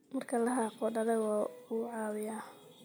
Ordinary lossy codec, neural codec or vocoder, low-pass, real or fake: none; none; none; real